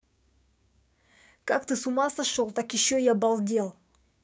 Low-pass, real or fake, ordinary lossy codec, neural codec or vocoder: none; fake; none; codec, 16 kHz, 6 kbps, DAC